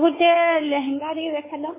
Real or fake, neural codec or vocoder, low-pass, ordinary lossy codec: fake; codec, 16 kHz, 4 kbps, X-Codec, WavLM features, trained on Multilingual LibriSpeech; 3.6 kHz; MP3, 16 kbps